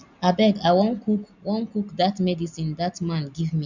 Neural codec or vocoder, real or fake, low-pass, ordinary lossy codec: none; real; 7.2 kHz; none